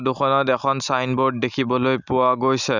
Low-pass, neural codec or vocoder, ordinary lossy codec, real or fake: 7.2 kHz; none; none; real